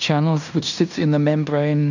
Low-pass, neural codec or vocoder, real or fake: 7.2 kHz; codec, 16 kHz in and 24 kHz out, 0.9 kbps, LongCat-Audio-Codec, fine tuned four codebook decoder; fake